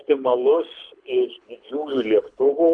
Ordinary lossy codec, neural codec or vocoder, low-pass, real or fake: MP3, 64 kbps; codec, 24 kHz, 6 kbps, HILCodec; 9.9 kHz; fake